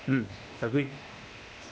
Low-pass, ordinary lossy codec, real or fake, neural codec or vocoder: none; none; fake; codec, 16 kHz, 0.8 kbps, ZipCodec